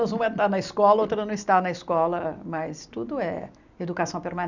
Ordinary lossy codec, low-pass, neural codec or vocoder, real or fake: none; 7.2 kHz; none; real